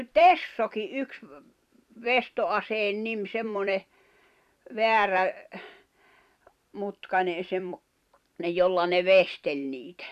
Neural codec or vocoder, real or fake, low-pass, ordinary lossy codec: vocoder, 44.1 kHz, 128 mel bands every 256 samples, BigVGAN v2; fake; 14.4 kHz; none